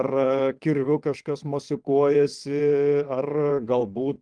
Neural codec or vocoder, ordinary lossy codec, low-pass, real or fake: vocoder, 22.05 kHz, 80 mel bands, WaveNeXt; Opus, 32 kbps; 9.9 kHz; fake